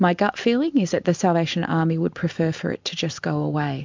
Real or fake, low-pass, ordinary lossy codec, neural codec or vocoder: real; 7.2 kHz; MP3, 64 kbps; none